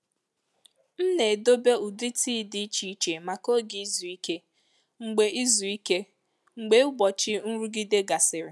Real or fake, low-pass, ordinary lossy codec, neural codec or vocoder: real; none; none; none